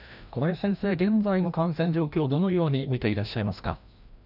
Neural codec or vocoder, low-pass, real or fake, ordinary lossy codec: codec, 16 kHz, 1 kbps, FreqCodec, larger model; 5.4 kHz; fake; none